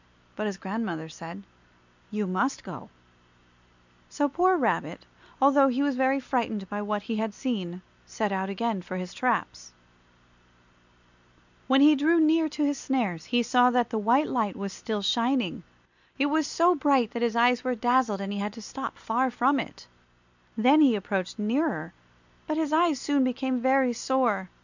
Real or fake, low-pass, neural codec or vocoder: real; 7.2 kHz; none